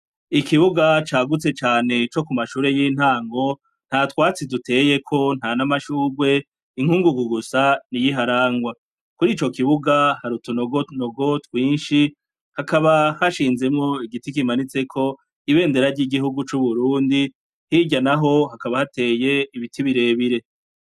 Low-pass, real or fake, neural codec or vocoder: 14.4 kHz; real; none